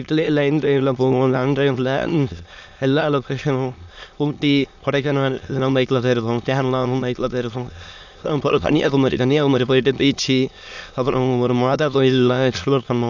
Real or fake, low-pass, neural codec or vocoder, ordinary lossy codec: fake; 7.2 kHz; autoencoder, 22.05 kHz, a latent of 192 numbers a frame, VITS, trained on many speakers; none